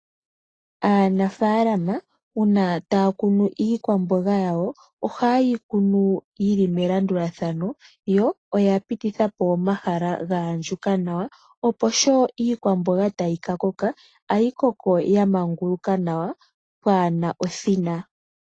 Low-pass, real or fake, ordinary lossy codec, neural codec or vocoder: 9.9 kHz; real; AAC, 32 kbps; none